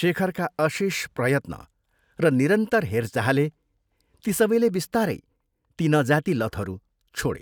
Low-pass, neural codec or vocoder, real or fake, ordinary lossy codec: none; none; real; none